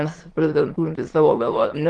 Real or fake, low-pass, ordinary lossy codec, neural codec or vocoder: fake; 9.9 kHz; Opus, 16 kbps; autoencoder, 22.05 kHz, a latent of 192 numbers a frame, VITS, trained on many speakers